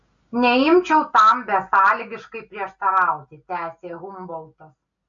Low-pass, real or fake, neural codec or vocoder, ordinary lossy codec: 7.2 kHz; real; none; AAC, 64 kbps